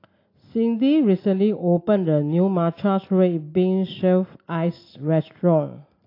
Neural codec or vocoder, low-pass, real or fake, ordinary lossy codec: none; 5.4 kHz; real; AAC, 32 kbps